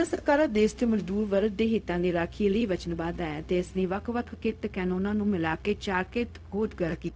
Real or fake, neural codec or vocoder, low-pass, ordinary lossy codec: fake; codec, 16 kHz, 0.4 kbps, LongCat-Audio-Codec; none; none